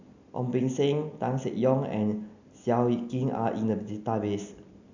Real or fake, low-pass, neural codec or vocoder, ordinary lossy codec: real; 7.2 kHz; none; none